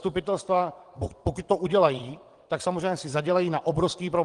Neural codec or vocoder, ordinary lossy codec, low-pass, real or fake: vocoder, 22.05 kHz, 80 mel bands, WaveNeXt; Opus, 24 kbps; 9.9 kHz; fake